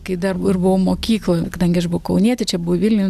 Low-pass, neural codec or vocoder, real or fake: 14.4 kHz; none; real